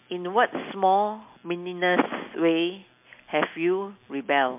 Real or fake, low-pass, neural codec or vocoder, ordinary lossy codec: real; 3.6 kHz; none; MP3, 32 kbps